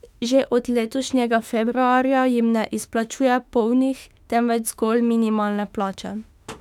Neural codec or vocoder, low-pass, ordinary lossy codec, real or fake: autoencoder, 48 kHz, 32 numbers a frame, DAC-VAE, trained on Japanese speech; 19.8 kHz; none; fake